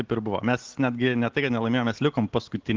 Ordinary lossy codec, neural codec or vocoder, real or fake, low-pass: Opus, 16 kbps; none; real; 7.2 kHz